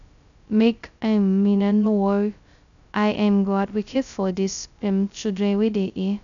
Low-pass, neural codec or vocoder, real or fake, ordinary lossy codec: 7.2 kHz; codec, 16 kHz, 0.2 kbps, FocalCodec; fake; none